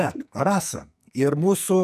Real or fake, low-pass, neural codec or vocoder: fake; 14.4 kHz; codec, 44.1 kHz, 2.6 kbps, SNAC